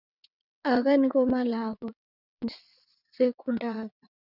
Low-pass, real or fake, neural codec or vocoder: 5.4 kHz; fake; vocoder, 22.05 kHz, 80 mel bands, WaveNeXt